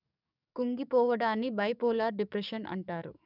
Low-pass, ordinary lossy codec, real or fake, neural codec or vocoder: 5.4 kHz; none; fake; codec, 44.1 kHz, 7.8 kbps, DAC